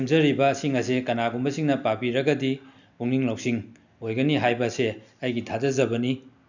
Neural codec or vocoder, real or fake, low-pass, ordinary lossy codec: none; real; 7.2 kHz; none